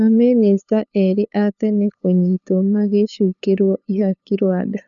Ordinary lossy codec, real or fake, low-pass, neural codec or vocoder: none; fake; 7.2 kHz; codec, 16 kHz, 2 kbps, FunCodec, trained on LibriTTS, 25 frames a second